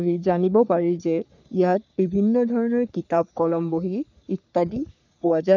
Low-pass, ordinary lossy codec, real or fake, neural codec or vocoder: 7.2 kHz; none; fake; codec, 44.1 kHz, 3.4 kbps, Pupu-Codec